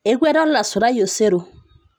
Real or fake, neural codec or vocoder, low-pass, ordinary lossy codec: real; none; none; none